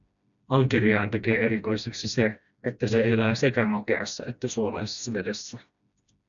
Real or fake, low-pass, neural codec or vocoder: fake; 7.2 kHz; codec, 16 kHz, 1 kbps, FreqCodec, smaller model